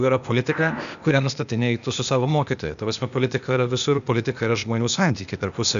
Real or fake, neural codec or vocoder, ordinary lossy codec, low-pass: fake; codec, 16 kHz, 0.8 kbps, ZipCodec; MP3, 96 kbps; 7.2 kHz